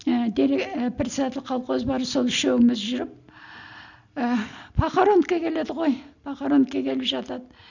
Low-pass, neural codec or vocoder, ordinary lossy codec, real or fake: 7.2 kHz; none; none; real